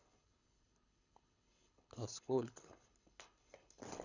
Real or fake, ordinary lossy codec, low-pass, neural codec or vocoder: fake; none; 7.2 kHz; codec, 24 kHz, 6 kbps, HILCodec